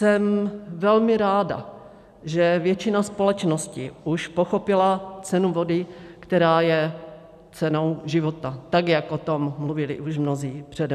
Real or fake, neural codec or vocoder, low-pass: real; none; 14.4 kHz